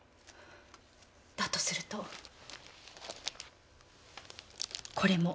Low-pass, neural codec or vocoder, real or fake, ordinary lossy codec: none; none; real; none